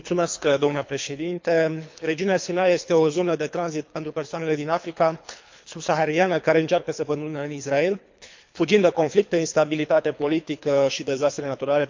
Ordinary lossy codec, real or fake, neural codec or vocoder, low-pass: MP3, 64 kbps; fake; codec, 24 kHz, 3 kbps, HILCodec; 7.2 kHz